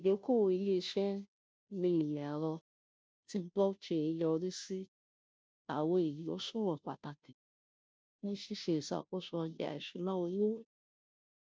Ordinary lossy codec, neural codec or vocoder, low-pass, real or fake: none; codec, 16 kHz, 0.5 kbps, FunCodec, trained on Chinese and English, 25 frames a second; none; fake